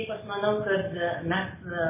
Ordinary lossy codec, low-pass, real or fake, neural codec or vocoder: MP3, 16 kbps; 3.6 kHz; real; none